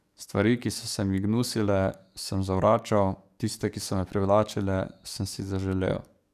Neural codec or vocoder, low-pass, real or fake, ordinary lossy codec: codec, 44.1 kHz, 7.8 kbps, DAC; 14.4 kHz; fake; none